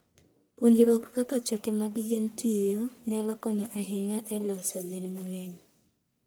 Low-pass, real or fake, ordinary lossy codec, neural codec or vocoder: none; fake; none; codec, 44.1 kHz, 1.7 kbps, Pupu-Codec